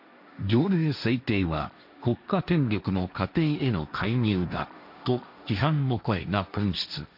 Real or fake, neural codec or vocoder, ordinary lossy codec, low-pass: fake; codec, 16 kHz, 1.1 kbps, Voila-Tokenizer; none; 5.4 kHz